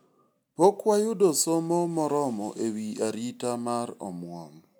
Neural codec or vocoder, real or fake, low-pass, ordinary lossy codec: none; real; none; none